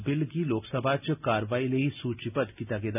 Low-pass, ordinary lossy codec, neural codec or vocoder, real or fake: 3.6 kHz; none; none; real